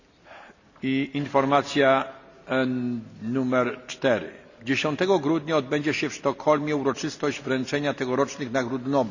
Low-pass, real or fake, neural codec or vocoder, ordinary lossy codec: 7.2 kHz; real; none; none